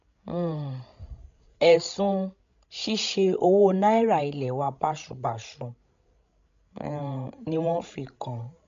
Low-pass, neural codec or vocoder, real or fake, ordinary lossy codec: 7.2 kHz; codec, 16 kHz, 16 kbps, FreqCodec, larger model; fake; MP3, 64 kbps